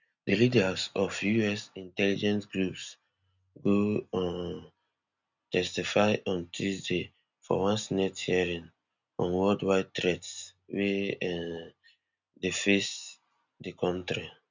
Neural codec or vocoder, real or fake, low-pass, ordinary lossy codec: none; real; 7.2 kHz; none